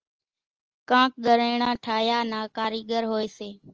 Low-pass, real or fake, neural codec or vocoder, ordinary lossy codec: 7.2 kHz; real; none; Opus, 24 kbps